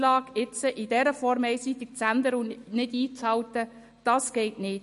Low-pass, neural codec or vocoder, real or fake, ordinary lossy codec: 14.4 kHz; none; real; MP3, 48 kbps